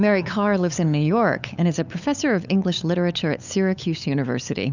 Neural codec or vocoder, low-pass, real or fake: codec, 16 kHz, 8 kbps, FunCodec, trained on LibriTTS, 25 frames a second; 7.2 kHz; fake